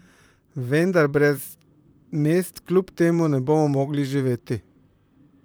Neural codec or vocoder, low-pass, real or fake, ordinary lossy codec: vocoder, 44.1 kHz, 128 mel bands, Pupu-Vocoder; none; fake; none